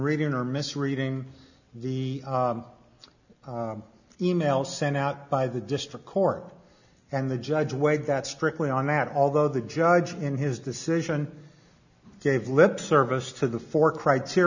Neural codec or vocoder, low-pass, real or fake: none; 7.2 kHz; real